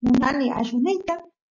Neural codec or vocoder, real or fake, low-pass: none; real; 7.2 kHz